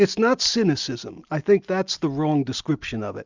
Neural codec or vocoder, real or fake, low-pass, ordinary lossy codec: none; real; 7.2 kHz; Opus, 64 kbps